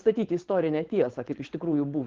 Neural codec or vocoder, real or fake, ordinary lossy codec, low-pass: codec, 16 kHz, 4.8 kbps, FACodec; fake; Opus, 16 kbps; 7.2 kHz